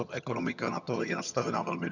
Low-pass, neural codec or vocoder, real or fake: 7.2 kHz; vocoder, 22.05 kHz, 80 mel bands, HiFi-GAN; fake